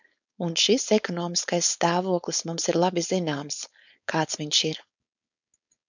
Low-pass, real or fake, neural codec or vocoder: 7.2 kHz; fake; codec, 16 kHz, 4.8 kbps, FACodec